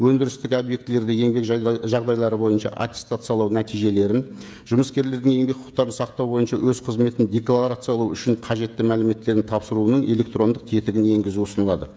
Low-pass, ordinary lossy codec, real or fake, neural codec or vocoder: none; none; fake; codec, 16 kHz, 16 kbps, FreqCodec, smaller model